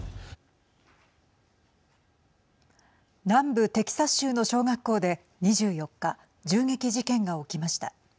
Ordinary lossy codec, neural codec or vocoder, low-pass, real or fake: none; none; none; real